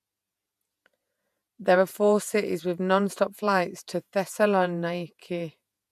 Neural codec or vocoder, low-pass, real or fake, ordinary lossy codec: vocoder, 48 kHz, 128 mel bands, Vocos; 14.4 kHz; fake; MP3, 96 kbps